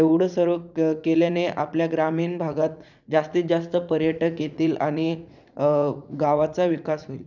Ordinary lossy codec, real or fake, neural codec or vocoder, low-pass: none; fake; vocoder, 44.1 kHz, 80 mel bands, Vocos; 7.2 kHz